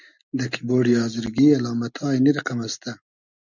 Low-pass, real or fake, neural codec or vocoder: 7.2 kHz; real; none